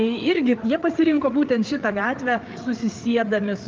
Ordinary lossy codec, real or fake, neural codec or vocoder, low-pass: Opus, 24 kbps; fake; codec, 16 kHz, 8 kbps, FreqCodec, larger model; 7.2 kHz